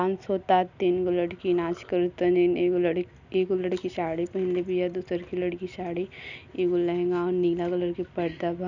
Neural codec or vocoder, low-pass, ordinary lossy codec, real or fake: none; 7.2 kHz; none; real